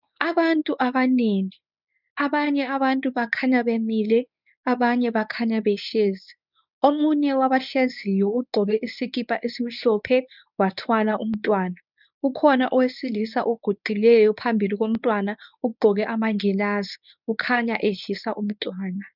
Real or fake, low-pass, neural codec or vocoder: fake; 5.4 kHz; codec, 24 kHz, 0.9 kbps, WavTokenizer, medium speech release version 1